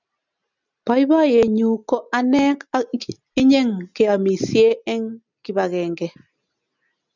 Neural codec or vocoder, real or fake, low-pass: none; real; 7.2 kHz